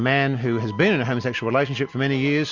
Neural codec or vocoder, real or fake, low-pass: none; real; 7.2 kHz